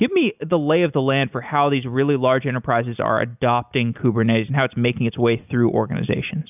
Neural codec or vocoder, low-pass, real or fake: none; 3.6 kHz; real